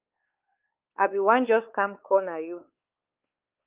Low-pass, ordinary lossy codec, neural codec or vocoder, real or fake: 3.6 kHz; Opus, 32 kbps; codec, 16 kHz, 4 kbps, X-Codec, WavLM features, trained on Multilingual LibriSpeech; fake